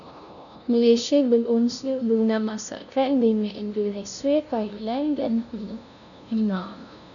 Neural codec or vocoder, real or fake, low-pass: codec, 16 kHz, 0.5 kbps, FunCodec, trained on LibriTTS, 25 frames a second; fake; 7.2 kHz